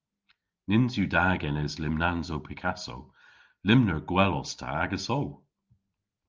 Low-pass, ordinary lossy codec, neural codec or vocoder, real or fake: 7.2 kHz; Opus, 32 kbps; none; real